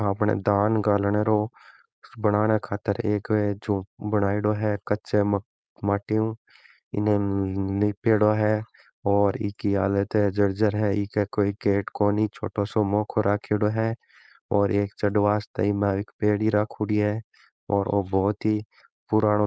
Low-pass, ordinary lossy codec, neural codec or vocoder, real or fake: none; none; codec, 16 kHz, 4.8 kbps, FACodec; fake